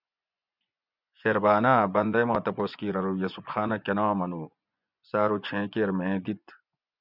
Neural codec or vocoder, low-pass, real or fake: vocoder, 24 kHz, 100 mel bands, Vocos; 5.4 kHz; fake